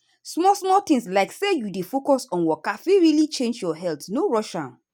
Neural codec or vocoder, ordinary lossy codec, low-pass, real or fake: none; none; 19.8 kHz; real